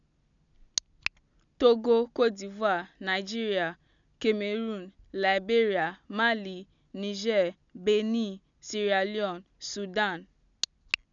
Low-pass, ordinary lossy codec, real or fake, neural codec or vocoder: 7.2 kHz; none; real; none